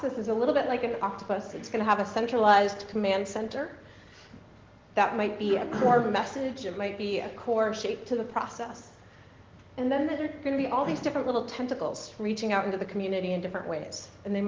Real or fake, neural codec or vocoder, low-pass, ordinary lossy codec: real; none; 7.2 kHz; Opus, 16 kbps